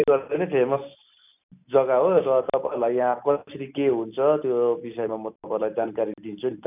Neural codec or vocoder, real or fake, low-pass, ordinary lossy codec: none; real; 3.6 kHz; none